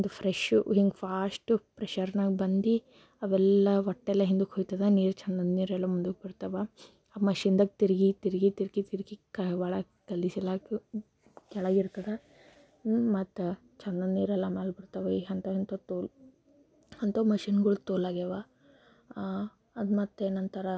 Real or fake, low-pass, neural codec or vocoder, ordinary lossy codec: real; none; none; none